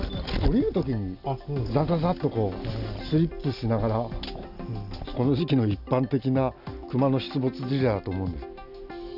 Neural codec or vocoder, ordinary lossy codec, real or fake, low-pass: none; none; real; 5.4 kHz